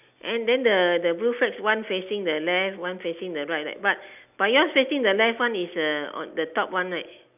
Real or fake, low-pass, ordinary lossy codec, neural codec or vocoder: real; 3.6 kHz; none; none